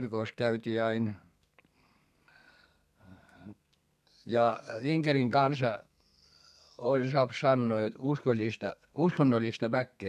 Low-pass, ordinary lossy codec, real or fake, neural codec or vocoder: 14.4 kHz; none; fake; codec, 32 kHz, 1.9 kbps, SNAC